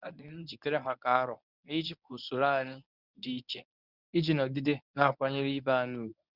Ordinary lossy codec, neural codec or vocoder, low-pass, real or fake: none; codec, 24 kHz, 0.9 kbps, WavTokenizer, medium speech release version 1; 5.4 kHz; fake